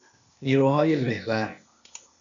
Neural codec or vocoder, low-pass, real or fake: codec, 16 kHz, 0.8 kbps, ZipCodec; 7.2 kHz; fake